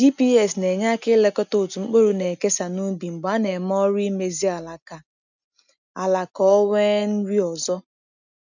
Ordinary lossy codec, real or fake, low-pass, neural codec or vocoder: none; real; 7.2 kHz; none